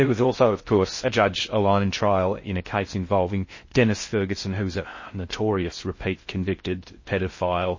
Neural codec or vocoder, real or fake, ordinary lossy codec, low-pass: codec, 16 kHz in and 24 kHz out, 0.6 kbps, FocalCodec, streaming, 4096 codes; fake; MP3, 32 kbps; 7.2 kHz